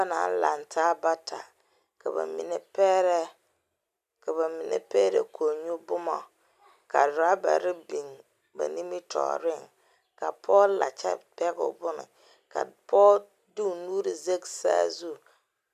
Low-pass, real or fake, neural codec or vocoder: 14.4 kHz; real; none